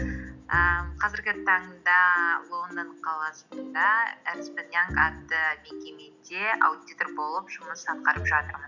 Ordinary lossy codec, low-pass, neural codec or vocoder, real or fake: none; 7.2 kHz; none; real